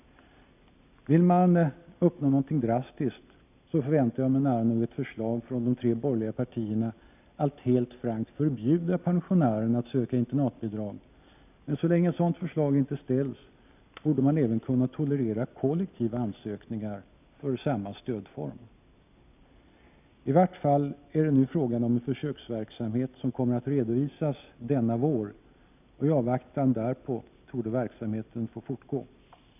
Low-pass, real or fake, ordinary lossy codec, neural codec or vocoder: 3.6 kHz; real; none; none